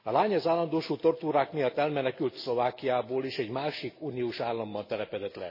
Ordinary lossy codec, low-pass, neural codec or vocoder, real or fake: MP3, 24 kbps; 5.4 kHz; none; real